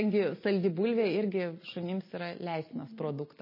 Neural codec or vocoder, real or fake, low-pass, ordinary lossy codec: none; real; 5.4 kHz; MP3, 24 kbps